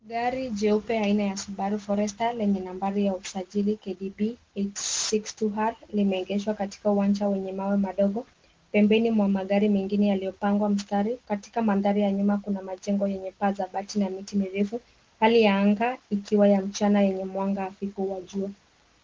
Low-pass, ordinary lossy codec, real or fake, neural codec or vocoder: 7.2 kHz; Opus, 16 kbps; real; none